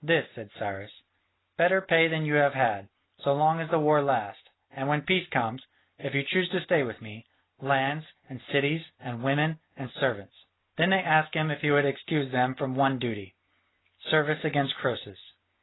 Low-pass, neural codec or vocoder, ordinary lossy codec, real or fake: 7.2 kHz; none; AAC, 16 kbps; real